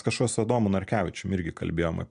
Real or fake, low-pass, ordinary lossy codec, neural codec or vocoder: real; 9.9 kHz; MP3, 96 kbps; none